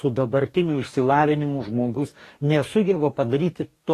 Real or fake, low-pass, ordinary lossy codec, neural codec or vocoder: fake; 14.4 kHz; AAC, 48 kbps; codec, 44.1 kHz, 2.6 kbps, DAC